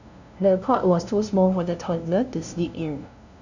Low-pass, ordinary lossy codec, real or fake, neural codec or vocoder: 7.2 kHz; none; fake; codec, 16 kHz, 0.5 kbps, FunCodec, trained on LibriTTS, 25 frames a second